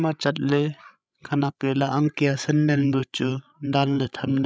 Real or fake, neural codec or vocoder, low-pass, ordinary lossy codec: fake; codec, 16 kHz, 16 kbps, FreqCodec, larger model; none; none